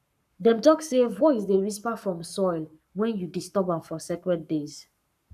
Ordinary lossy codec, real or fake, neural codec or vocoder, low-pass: none; fake; codec, 44.1 kHz, 7.8 kbps, Pupu-Codec; 14.4 kHz